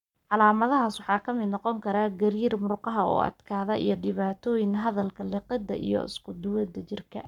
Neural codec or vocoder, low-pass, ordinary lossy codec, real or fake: codec, 44.1 kHz, 7.8 kbps, Pupu-Codec; 19.8 kHz; none; fake